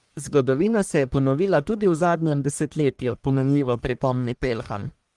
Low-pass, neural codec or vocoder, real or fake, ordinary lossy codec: 10.8 kHz; codec, 44.1 kHz, 1.7 kbps, Pupu-Codec; fake; Opus, 24 kbps